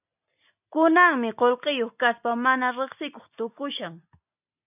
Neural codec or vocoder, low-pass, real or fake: none; 3.6 kHz; real